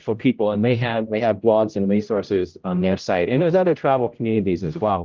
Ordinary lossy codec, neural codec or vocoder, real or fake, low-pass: Opus, 24 kbps; codec, 16 kHz, 0.5 kbps, X-Codec, HuBERT features, trained on general audio; fake; 7.2 kHz